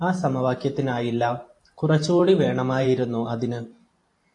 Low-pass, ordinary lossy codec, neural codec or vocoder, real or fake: 10.8 kHz; AAC, 48 kbps; vocoder, 44.1 kHz, 128 mel bands every 512 samples, BigVGAN v2; fake